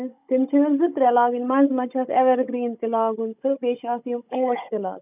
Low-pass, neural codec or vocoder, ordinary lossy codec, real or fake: 3.6 kHz; codec, 16 kHz, 16 kbps, FunCodec, trained on Chinese and English, 50 frames a second; none; fake